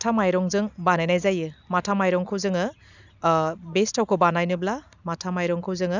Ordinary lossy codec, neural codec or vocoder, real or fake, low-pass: none; none; real; 7.2 kHz